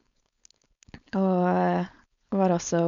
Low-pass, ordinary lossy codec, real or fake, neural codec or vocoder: 7.2 kHz; none; fake; codec, 16 kHz, 4.8 kbps, FACodec